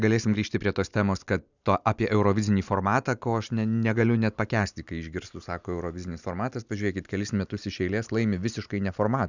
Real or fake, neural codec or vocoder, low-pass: real; none; 7.2 kHz